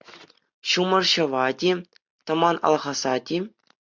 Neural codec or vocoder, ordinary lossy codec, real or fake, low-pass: none; MP3, 64 kbps; real; 7.2 kHz